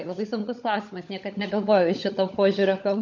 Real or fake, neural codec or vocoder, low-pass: fake; codec, 16 kHz, 16 kbps, FunCodec, trained on LibriTTS, 50 frames a second; 7.2 kHz